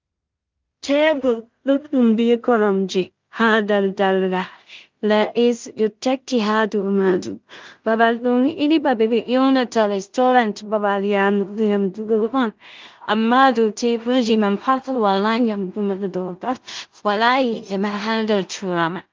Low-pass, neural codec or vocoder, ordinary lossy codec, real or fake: 7.2 kHz; codec, 16 kHz in and 24 kHz out, 0.4 kbps, LongCat-Audio-Codec, two codebook decoder; Opus, 32 kbps; fake